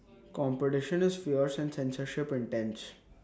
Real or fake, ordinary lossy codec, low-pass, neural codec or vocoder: real; none; none; none